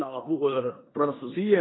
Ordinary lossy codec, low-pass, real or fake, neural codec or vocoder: AAC, 16 kbps; 7.2 kHz; fake; codec, 16 kHz in and 24 kHz out, 0.9 kbps, LongCat-Audio-Codec, four codebook decoder